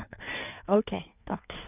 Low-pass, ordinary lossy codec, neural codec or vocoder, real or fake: 3.6 kHz; none; codec, 16 kHz in and 24 kHz out, 1.1 kbps, FireRedTTS-2 codec; fake